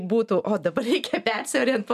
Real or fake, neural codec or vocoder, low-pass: real; none; 14.4 kHz